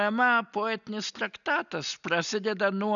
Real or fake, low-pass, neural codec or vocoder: real; 7.2 kHz; none